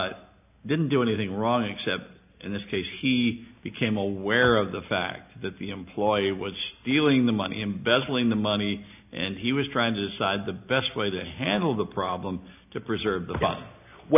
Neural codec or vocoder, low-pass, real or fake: none; 3.6 kHz; real